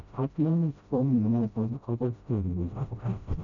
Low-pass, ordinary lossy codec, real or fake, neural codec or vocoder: 7.2 kHz; none; fake; codec, 16 kHz, 0.5 kbps, FreqCodec, smaller model